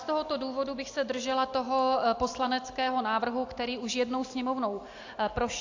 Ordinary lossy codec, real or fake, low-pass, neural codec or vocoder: AAC, 48 kbps; real; 7.2 kHz; none